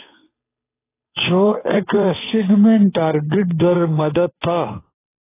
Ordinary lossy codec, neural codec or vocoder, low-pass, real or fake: AAC, 16 kbps; codec, 16 kHz, 2 kbps, FunCodec, trained on Chinese and English, 25 frames a second; 3.6 kHz; fake